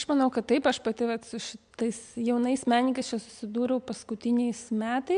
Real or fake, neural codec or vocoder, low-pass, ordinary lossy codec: real; none; 9.9 kHz; MP3, 64 kbps